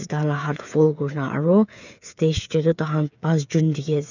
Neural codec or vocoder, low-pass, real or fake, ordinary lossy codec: codec, 16 kHz, 8 kbps, FreqCodec, smaller model; 7.2 kHz; fake; none